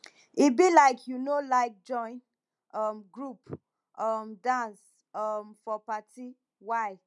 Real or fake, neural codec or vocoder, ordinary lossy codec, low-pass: real; none; none; 10.8 kHz